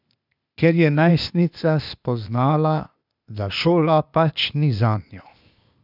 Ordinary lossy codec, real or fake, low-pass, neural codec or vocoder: none; fake; 5.4 kHz; codec, 16 kHz, 0.8 kbps, ZipCodec